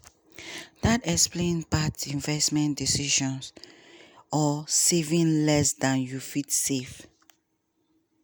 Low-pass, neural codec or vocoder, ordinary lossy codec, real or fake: none; none; none; real